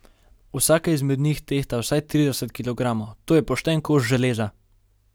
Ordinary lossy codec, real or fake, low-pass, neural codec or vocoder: none; real; none; none